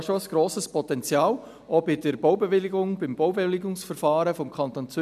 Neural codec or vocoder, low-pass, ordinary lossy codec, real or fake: none; 14.4 kHz; none; real